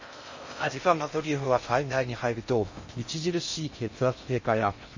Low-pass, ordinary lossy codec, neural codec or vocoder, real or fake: 7.2 kHz; MP3, 32 kbps; codec, 16 kHz in and 24 kHz out, 0.6 kbps, FocalCodec, streaming, 4096 codes; fake